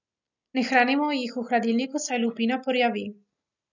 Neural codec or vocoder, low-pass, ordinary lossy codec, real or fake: none; 7.2 kHz; none; real